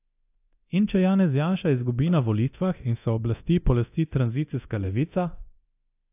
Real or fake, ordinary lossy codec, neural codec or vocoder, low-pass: fake; AAC, 32 kbps; codec, 24 kHz, 0.9 kbps, DualCodec; 3.6 kHz